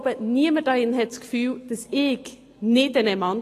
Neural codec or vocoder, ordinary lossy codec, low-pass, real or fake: none; AAC, 48 kbps; 14.4 kHz; real